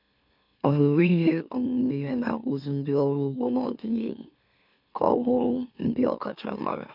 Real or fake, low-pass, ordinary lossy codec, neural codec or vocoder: fake; 5.4 kHz; none; autoencoder, 44.1 kHz, a latent of 192 numbers a frame, MeloTTS